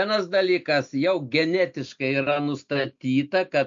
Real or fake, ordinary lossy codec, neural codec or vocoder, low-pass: real; MP3, 48 kbps; none; 7.2 kHz